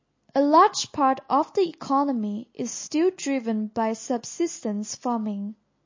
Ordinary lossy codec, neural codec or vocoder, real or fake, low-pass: MP3, 32 kbps; none; real; 7.2 kHz